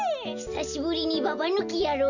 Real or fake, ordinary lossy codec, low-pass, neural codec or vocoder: real; none; 7.2 kHz; none